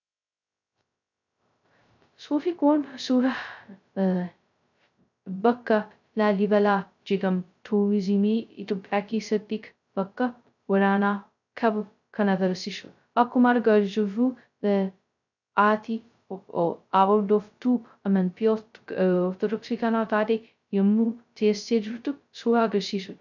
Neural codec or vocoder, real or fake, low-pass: codec, 16 kHz, 0.2 kbps, FocalCodec; fake; 7.2 kHz